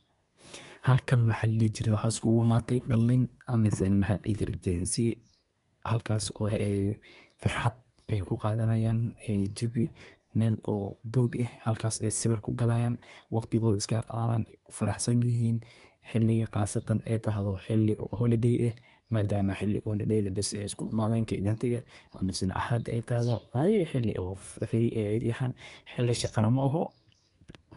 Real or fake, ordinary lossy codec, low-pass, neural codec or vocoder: fake; none; 10.8 kHz; codec, 24 kHz, 1 kbps, SNAC